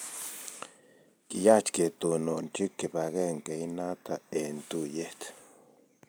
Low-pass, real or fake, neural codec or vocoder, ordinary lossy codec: none; fake; vocoder, 44.1 kHz, 128 mel bands every 256 samples, BigVGAN v2; none